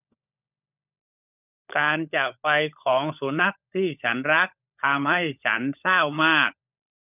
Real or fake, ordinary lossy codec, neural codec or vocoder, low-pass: fake; none; codec, 16 kHz, 16 kbps, FunCodec, trained on LibriTTS, 50 frames a second; 3.6 kHz